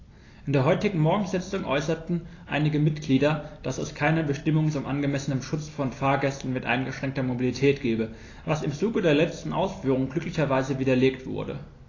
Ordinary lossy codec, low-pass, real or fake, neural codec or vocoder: AAC, 32 kbps; 7.2 kHz; real; none